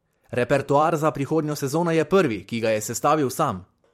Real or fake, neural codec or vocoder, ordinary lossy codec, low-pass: fake; vocoder, 44.1 kHz, 128 mel bands every 512 samples, BigVGAN v2; MP3, 64 kbps; 19.8 kHz